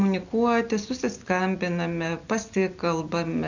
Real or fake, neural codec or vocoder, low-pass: real; none; 7.2 kHz